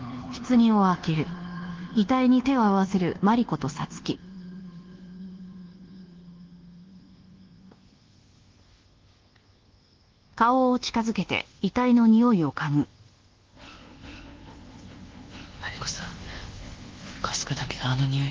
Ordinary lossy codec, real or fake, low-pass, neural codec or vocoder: Opus, 16 kbps; fake; 7.2 kHz; codec, 24 kHz, 1.2 kbps, DualCodec